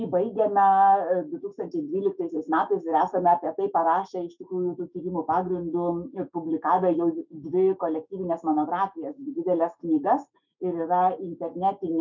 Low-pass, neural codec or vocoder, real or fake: 7.2 kHz; autoencoder, 48 kHz, 128 numbers a frame, DAC-VAE, trained on Japanese speech; fake